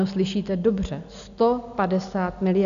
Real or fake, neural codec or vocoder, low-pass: real; none; 7.2 kHz